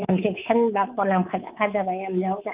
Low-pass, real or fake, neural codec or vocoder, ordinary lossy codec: 3.6 kHz; fake; codec, 24 kHz, 3.1 kbps, DualCodec; Opus, 32 kbps